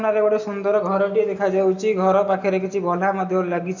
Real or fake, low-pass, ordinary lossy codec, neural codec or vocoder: real; 7.2 kHz; none; none